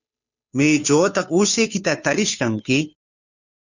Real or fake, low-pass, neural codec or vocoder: fake; 7.2 kHz; codec, 16 kHz, 2 kbps, FunCodec, trained on Chinese and English, 25 frames a second